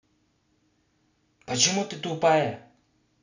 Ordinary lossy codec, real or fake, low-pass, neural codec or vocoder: none; real; 7.2 kHz; none